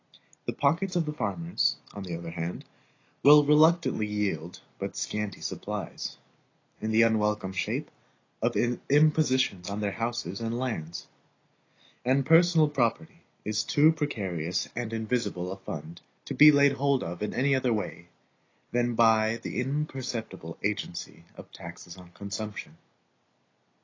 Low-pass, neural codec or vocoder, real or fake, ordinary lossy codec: 7.2 kHz; none; real; AAC, 32 kbps